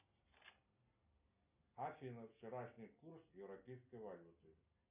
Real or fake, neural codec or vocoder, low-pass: real; none; 3.6 kHz